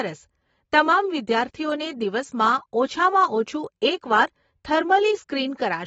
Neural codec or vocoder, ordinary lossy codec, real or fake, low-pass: vocoder, 44.1 kHz, 128 mel bands every 512 samples, BigVGAN v2; AAC, 24 kbps; fake; 19.8 kHz